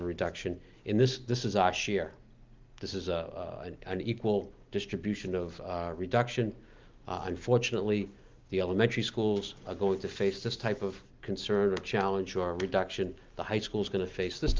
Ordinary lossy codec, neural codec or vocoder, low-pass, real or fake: Opus, 24 kbps; none; 7.2 kHz; real